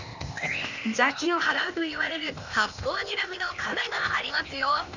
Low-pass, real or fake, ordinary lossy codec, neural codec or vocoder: 7.2 kHz; fake; none; codec, 16 kHz, 0.8 kbps, ZipCodec